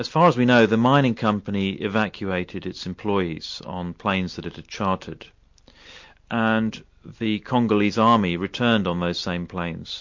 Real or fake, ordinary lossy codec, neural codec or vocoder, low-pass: real; MP3, 48 kbps; none; 7.2 kHz